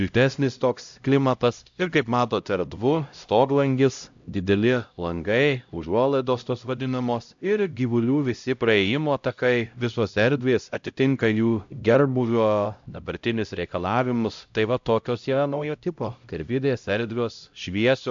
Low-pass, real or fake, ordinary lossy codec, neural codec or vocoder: 7.2 kHz; fake; AAC, 64 kbps; codec, 16 kHz, 0.5 kbps, X-Codec, HuBERT features, trained on LibriSpeech